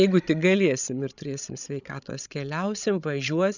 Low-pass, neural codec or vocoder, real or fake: 7.2 kHz; codec, 16 kHz, 16 kbps, FreqCodec, larger model; fake